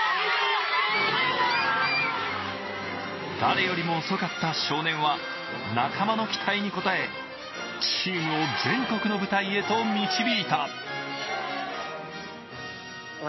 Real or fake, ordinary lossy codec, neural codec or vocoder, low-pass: real; MP3, 24 kbps; none; 7.2 kHz